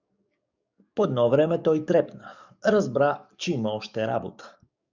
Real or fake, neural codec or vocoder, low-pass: fake; codec, 44.1 kHz, 7.8 kbps, DAC; 7.2 kHz